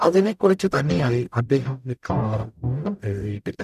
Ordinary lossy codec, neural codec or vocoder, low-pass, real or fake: none; codec, 44.1 kHz, 0.9 kbps, DAC; 14.4 kHz; fake